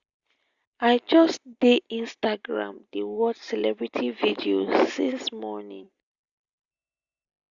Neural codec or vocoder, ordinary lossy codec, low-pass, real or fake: none; Opus, 64 kbps; 7.2 kHz; real